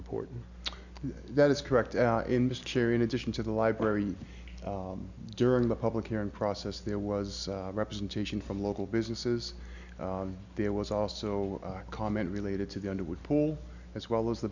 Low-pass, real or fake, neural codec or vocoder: 7.2 kHz; real; none